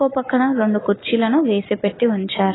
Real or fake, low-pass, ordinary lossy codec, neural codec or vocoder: real; 7.2 kHz; AAC, 16 kbps; none